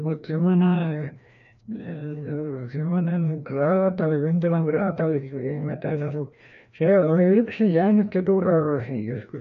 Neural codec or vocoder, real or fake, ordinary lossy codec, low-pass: codec, 16 kHz, 1 kbps, FreqCodec, larger model; fake; AAC, 64 kbps; 7.2 kHz